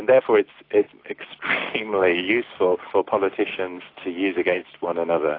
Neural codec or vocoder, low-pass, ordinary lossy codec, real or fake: none; 5.4 kHz; AAC, 32 kbps; real